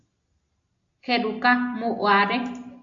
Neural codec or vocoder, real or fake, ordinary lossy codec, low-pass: none; real; AAC, 64 kbps; 7.2 kHz